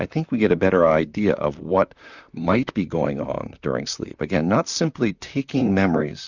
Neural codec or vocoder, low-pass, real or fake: vocoder, 44.1 kHz, 128 mel bands, Pupu-Vocoder; 7.2 kHz; fake